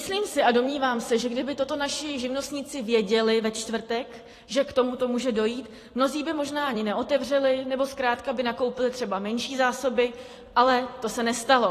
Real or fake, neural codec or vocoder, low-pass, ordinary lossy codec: fake; vocoder, 44.1 kHz, 128 mel bands every 256 samples, BigVGAN v2; 14.4 kHz; AAC, 48 kbps